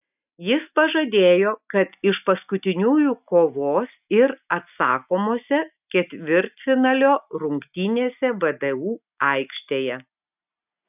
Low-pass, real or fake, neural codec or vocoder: 3.6 kHz; real; none